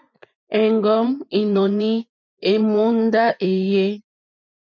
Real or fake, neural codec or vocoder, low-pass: fake; vocoder, 24 kHz, 100 mel bands, Vocos; 7.2 kHz